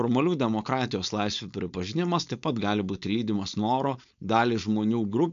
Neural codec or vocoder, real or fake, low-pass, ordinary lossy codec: codec, 16 kHz, 4.8 kbps, FACodec; fake; 7.2 kHz; MP3, 64 kbps